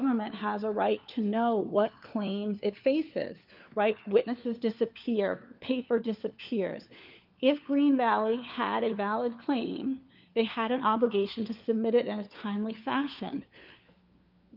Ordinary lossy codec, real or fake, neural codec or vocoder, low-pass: Opus, 24 kbps; fake; codec, 16 kHz, 4 kbps, FunCodec, trained on LibriTTS, 50 frames a second; 5.4 kHz